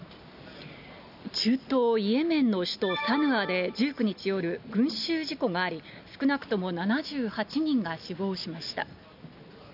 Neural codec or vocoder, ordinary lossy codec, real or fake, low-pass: none; none; real; 5.4 kHz